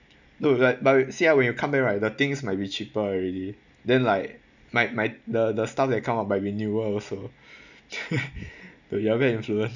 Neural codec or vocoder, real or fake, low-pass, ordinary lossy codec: none; real; 7.2 kHz; none